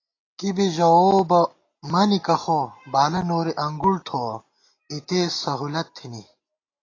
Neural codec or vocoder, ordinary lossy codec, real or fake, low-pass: none; AAC, 32 kbps; real; 7.2 kHz